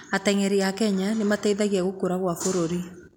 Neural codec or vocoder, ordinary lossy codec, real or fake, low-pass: none; none; real; 19.8 kHz